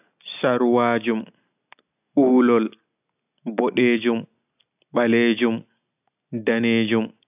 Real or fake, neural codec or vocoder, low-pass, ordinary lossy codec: real; none; 3.6 kHz; AAC, 32 kbps